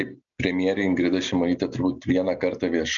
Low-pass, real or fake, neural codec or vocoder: 7.2 kHz; real; none